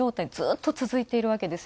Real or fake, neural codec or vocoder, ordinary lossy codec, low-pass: real; none; none; none